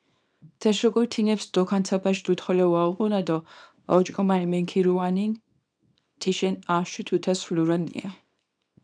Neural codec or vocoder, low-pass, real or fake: codec, 24 kHz, 0.9 kbps, WavTokenizer, small release; 9.9 kHz; fake